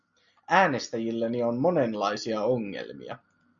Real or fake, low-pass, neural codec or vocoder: real; 7.2 kHz; none